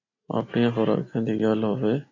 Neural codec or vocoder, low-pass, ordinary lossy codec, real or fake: none; 7.2 kHz; AAC, 32 kbps; real